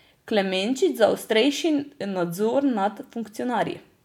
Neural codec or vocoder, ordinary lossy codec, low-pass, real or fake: none; none; 19.8 kHz; real